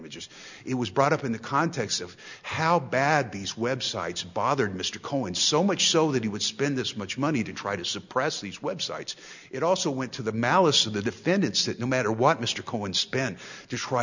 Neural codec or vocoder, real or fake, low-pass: none; real; 7.2 kHz